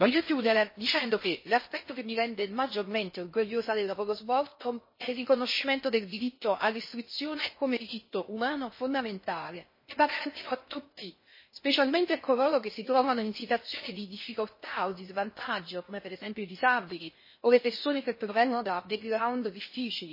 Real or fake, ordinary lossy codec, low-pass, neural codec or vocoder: fake; MP3, 24 kbps; 5.4 kHz; codec, 16 kHz in and 24 kHz out, 0.6 kbps, FocalCodec, streaming, 4096 codes